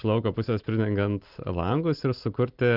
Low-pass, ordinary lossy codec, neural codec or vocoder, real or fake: 5.4 kHz; Opus, 32 kbps; none; real